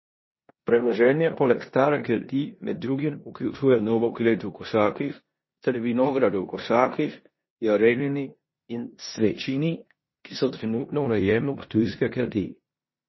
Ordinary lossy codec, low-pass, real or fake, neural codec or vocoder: MP3, 24 kbps; 7.2 kHz; fake; codec, 16 kHz in and 24 kHz out, 0.9 kbps, LongCat-Audio-Codec, four codebook decoder